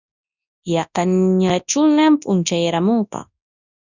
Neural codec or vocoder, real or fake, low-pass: codec, 24 kHz, 0.9 kbps, WavTokenizer, large speech release; fake; 7.2 kHz